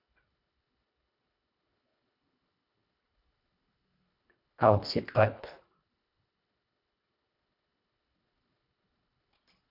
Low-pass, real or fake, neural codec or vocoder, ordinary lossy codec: 5.4 kHz; fake; codec, 24 kHz, 1.5 kbps, HILCodec; AAC, 48 kbps